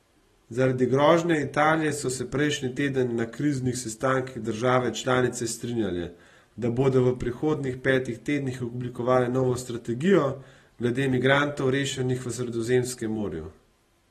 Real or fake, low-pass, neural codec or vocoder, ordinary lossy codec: real; 19.8 kHz; none; AAC, 32 kbps